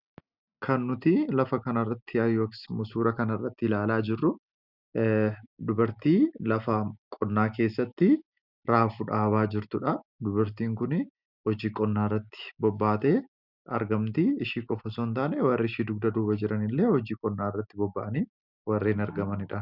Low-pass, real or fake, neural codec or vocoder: 5.4 kHz; real; none